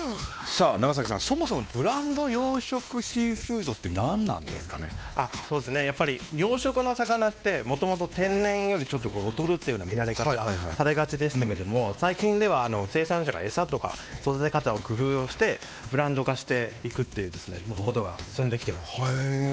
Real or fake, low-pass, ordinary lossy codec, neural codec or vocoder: fake; none; none; codec, 16 kHz, 2 kbps, X-Codec, WavLM features, trained on Multilingual LibriSpeech